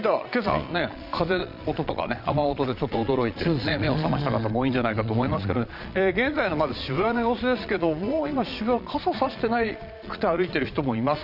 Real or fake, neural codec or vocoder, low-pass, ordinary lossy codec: fake; vocoder, 22.05 kHz, 80 mel bands, Vocos; 5.4 kHz; none